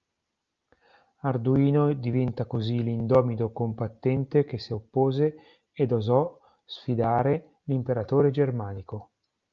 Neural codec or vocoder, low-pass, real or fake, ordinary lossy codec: none; 7.2 kHz; real; Opus, 24 kbps